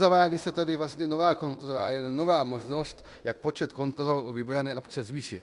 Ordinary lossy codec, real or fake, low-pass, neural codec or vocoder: Opus, 64 kbps; fake; 10.8 kHz; codec, 16 kHz in and 24 kHz out, 0.9 kbps, LongCat-Audio-Codec, fine tuned four codebook decoder